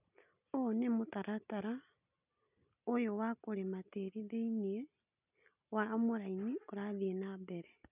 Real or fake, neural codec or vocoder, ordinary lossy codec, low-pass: real; none; none; 3.6 kHz